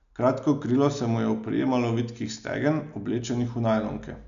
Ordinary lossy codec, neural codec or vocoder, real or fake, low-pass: MP3, 96 kbps; none; real; 7.2 kHz